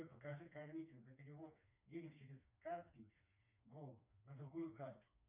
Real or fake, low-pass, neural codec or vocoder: fake; 3.6 kHz; codec, 16 kHz, 2 kbps, FreqCodec, smaller model